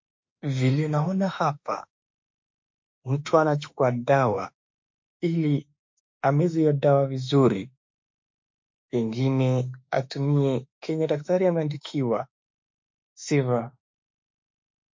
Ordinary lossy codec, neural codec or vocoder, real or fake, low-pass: MP3, 48 kbps; autoencoder, 48 kHz, 32 numbers a frame, DAC-VAE, trained on Japanese speech; fake; 7.2 kHz